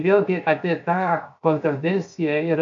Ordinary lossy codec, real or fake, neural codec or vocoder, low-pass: MP3, 96 kbps; fake; codec, 16 kHz, 0.7 kbps, FocalCodec; 7.2 kHz